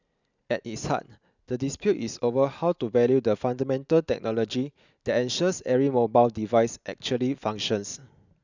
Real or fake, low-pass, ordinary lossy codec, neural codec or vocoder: real; 7.2 kHz; none; none